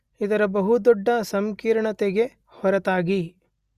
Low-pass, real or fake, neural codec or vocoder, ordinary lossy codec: 14.4 kHz; real; none; Opus, 64 kbps